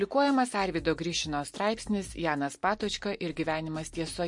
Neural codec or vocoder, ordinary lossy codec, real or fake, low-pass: none; MP3, 48 kbps; real; 10.8 kHz